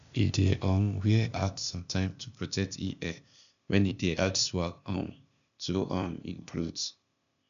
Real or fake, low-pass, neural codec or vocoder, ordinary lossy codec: fake; 7.2 kHz; codec, 16 kHz, 0.8 kbps, ZipCodec; MP3, 96 kbps